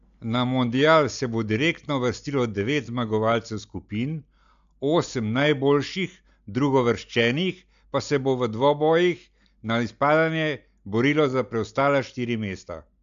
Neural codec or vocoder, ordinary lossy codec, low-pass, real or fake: none; MP3, 64 kbps; 7.2 kHz; real